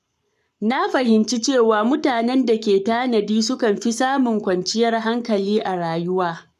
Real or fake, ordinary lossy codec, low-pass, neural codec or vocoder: fake; none; 14.4 kHz; codec, 44.1 kHz, 7.8 kbps, Pupu-Codec